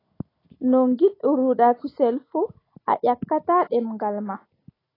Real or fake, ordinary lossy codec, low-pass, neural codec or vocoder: real; AAC, 24 kbps; 5.4 kHz; none